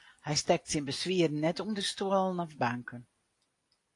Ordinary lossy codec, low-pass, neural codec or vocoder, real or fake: AAC, 48 kbps; 10.8 kHz; none; real